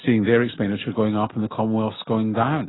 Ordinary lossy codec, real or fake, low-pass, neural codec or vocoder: AAC, 16 kbps; real; 7.2 kHz; none